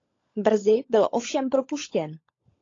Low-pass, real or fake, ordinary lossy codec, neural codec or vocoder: 7.2 kHz; fake; AAC, 32 kbps; codec, 16 kHz, 16 kbps, FunCodec, trained on LibriTTS, 50 frames a second